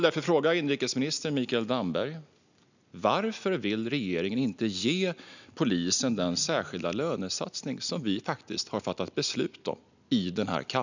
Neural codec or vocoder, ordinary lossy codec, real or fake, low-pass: none; none; real; 7.2 kHz